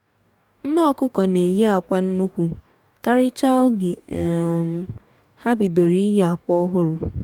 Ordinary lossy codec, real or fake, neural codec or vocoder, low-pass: none; fake; codec, 44.1 kHz, 2.6 kbps, DAC; 19.8 kHz